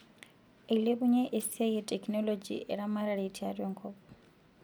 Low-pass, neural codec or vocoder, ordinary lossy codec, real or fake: none; none; none; real